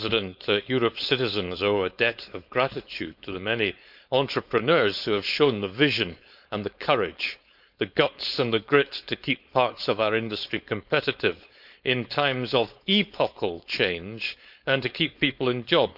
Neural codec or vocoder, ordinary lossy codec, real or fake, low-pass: codec, 16 kHz, 4.8 kbps, FACodec; none; fake; 5.4 kHz